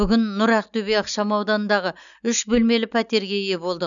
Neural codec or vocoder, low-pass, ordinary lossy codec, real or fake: none; 7.2 kHz; none; real